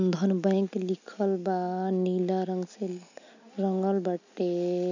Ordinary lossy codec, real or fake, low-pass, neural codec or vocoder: none; real; 7.2 kHz; none